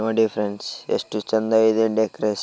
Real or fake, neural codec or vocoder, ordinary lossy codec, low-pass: real; none; none; none